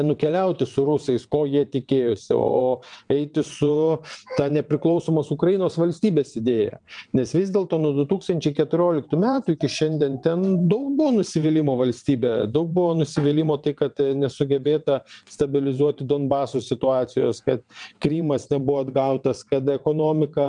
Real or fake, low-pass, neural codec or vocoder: fake; 9.9 kHz; vocoder, 22.05 kHz, 80 mel bands, WaveNeXt